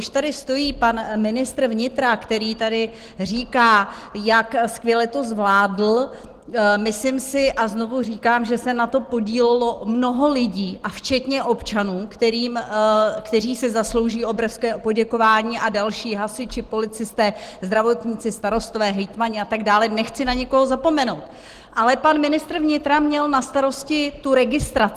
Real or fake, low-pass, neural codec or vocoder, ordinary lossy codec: real; 14.4 kHz; none; Opus, 16 kbps